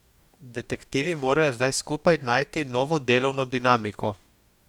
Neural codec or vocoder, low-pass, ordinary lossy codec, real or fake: codec, 44.1 kHz, 2.6 kbps, DAC; 19.8 kHz; none; fake